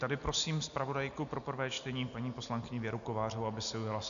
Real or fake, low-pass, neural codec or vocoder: real; 7.2 kHz; none